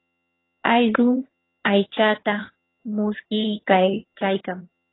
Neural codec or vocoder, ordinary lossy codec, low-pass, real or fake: vocoder, 22.05 kHz, 80 mel bands, HiFi-GAN; AAC, 16 kbps; 7.2 kHz; fake